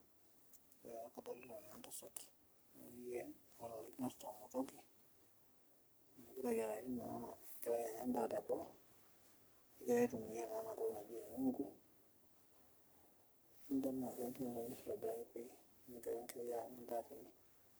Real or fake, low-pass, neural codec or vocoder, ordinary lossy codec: fake; none; codec, 44.1 kHz, 3.4 kbps, Pupu-Codec; none